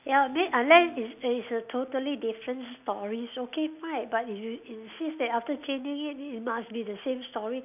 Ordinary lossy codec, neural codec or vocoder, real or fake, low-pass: none; none; real; 3.6 kHz